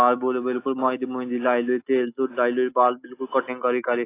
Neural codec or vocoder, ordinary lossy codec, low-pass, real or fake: none; AAC, 24 kbps; 3.6 kHz; real